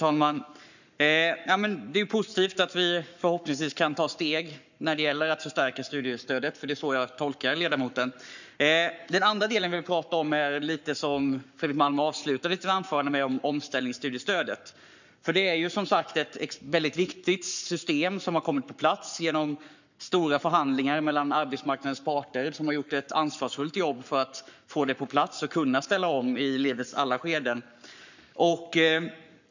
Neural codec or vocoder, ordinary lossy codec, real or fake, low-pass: codec, 44.1 kHz, 7.8 kbps, Pupu-Codec; none; fake; 7.2 kHz